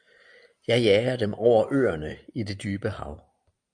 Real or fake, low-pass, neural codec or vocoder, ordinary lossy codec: real; 9.9 kHz; none; MP3, 96 kbps